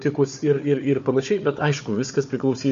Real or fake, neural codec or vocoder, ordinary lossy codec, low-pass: fake; codec, 16 kHz, 4 kbps, FunCodec, trained on Chinese and English, 50 frames a second; MP3, 48 kbps; 7.2 kHz